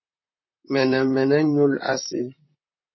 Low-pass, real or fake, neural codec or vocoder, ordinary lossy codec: 7.2 kHz; fake; vocoder, 44.1 kHz, 128 mel bands, Pupu-Vocoder; MP3, 24 kbps